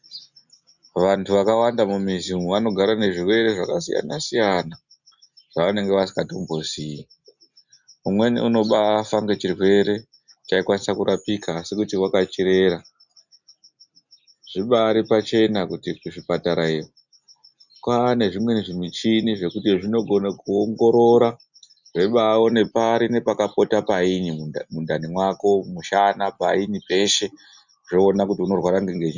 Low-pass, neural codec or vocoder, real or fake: 7.2 kHz; none; real